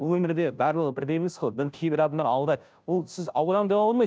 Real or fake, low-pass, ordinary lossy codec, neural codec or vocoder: fake; none; none; codec, 16 kHz, 0.5 kbps, FunCodec, trained on Chinese and English, 25 frames a second